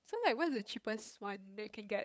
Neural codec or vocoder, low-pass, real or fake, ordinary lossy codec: codec, 16 kHz, 8 kbps, FunCodec, trained on LibriTTS, 25 frames a second; none; fake; none